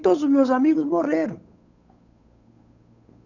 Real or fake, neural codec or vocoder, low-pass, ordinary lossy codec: fake; codec, 16 kHz, 8 kbps, FunCodec, trained on Chinese and English, 25 frames a second; 7.2 kHz; none